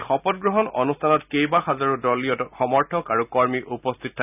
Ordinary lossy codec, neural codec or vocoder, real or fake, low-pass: none; none; real; 3.6 kHz